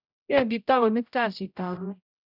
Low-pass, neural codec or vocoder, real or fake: 5.4 kHz; codec, 16 kHz, 0.5 kbps, X-Codec, HuBERT features, trained on general audio; fake